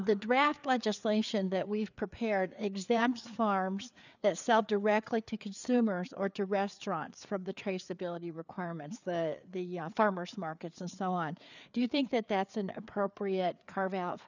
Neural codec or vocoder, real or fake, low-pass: codec, 16 kHz, 4 kbps, FreqCodec, larger model; fake; 7.2 kHz